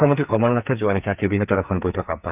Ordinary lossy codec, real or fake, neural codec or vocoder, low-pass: none; fake; codec, 44.1 kHz, 2.6 kbps, SNAC; 3.6 kHz